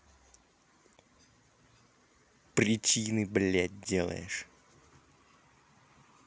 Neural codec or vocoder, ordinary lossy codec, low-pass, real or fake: none; none; none; real